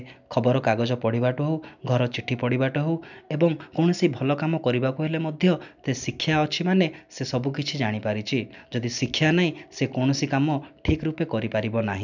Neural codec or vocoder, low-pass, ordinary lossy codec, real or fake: none; 7.2 kHz; none; real